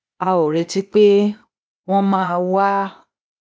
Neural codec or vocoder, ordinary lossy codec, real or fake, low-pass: codec, 16 kHz, 0.8 kbps, ZipCodec; none; fake; none